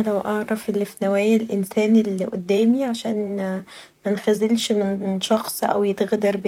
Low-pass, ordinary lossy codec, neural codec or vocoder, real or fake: 19.8 kHz; none; vocoder, 44.1 kHz, 128 mel bands, Pupu-Vocoder; fake